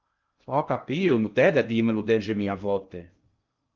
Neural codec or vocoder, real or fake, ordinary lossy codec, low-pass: codec, 16 kHz in and 24 kHz out, 0.6 kbps, FocalCodec, streaming, 2048 codes; fake; Opus, 24 kbps; 7.2 kHz